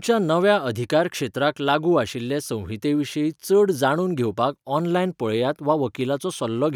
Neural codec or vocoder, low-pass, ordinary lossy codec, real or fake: none; 19.8 kHz; none; real